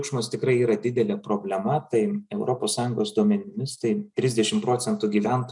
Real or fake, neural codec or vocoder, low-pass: real; none; 10.8 kHz